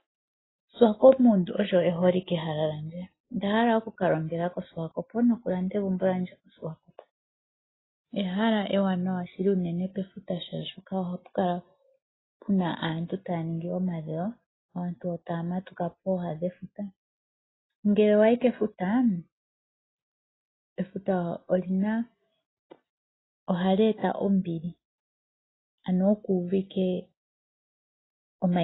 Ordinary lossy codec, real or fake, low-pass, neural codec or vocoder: AAC, 16 kbps; real; 7.2 kHz; none